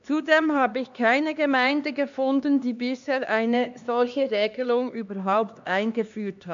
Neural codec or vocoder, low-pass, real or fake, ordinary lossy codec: codec, 16 kHz, 2 kbps, X-Codec, HuBERT features, trained on LibriSpeech; 7.2 kHz; fake; AAC, 64 kbps